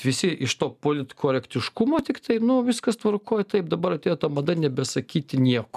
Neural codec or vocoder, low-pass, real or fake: none; 14.4 kHz; real